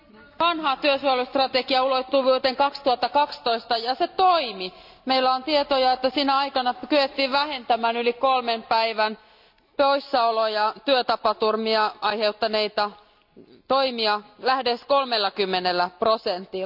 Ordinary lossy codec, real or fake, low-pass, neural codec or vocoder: none; real; 5.4 kHz; none